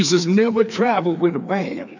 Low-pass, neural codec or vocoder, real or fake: 7.2 kHz; codec, 16 kHz, 2 kbps, FreqCodec, larger model; fake